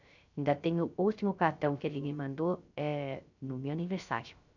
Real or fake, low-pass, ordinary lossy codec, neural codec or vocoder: fake; 7.2 kHz; none; codec, 16 kHz, 0.3 kbps, FocalCodec